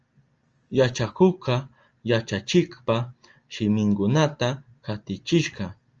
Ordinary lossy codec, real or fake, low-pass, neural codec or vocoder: Opus, 24 kbps; real; 7.2 kHz; none